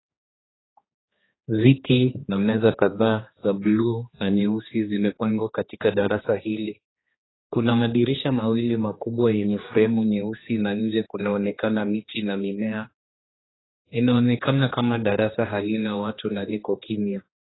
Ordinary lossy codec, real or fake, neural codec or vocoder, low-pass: AAC, 16 kbps; fake; codec, 16 kHz, 2 kbps, X-Codec, HuBERT features, trained on general audio; 7.2 kHz